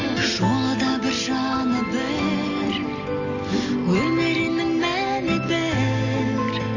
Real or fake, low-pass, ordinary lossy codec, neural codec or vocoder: real; 7.2 kHz; none; none